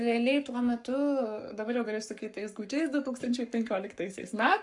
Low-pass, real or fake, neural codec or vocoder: 10.8 kHz; fake; codec, 44.1 kHz, 7.8 kbps, Pupu-Codec